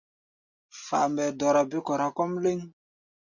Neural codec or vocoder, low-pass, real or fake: none; 7.2 kHz; real